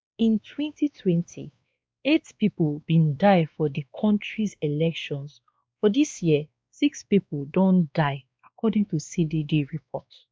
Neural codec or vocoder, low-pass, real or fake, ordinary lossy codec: codec, 16 kHz, 2 kbps, X-Codec, WavLM features, trained on Multilingual LibriSpeech; none; fake; none